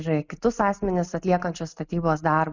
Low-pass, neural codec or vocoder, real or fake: 7.2 kHz; none; real